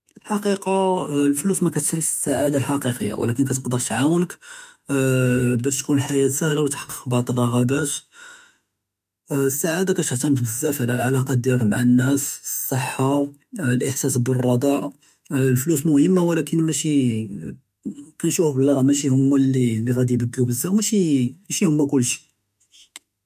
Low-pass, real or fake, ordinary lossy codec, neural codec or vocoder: 14.4 kHz; fake; MP3, 96 kbps; autoencoder, 48 kHz, 32 numbers a frame, DAC-VAE, trained on Japanese speech